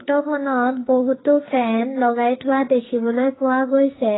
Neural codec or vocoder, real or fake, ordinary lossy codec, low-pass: codec, 44.1 kHz, 2.6 kbps, SNAC; fake; AAC, 16 kbps; 7.2 kHz